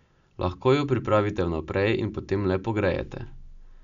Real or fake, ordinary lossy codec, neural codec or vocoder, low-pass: real; none; none; 7.2 kHz